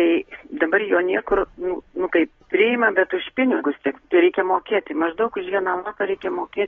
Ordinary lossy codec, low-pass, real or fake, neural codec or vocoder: AAC, 24 kbps; 7.2 kHz; real; none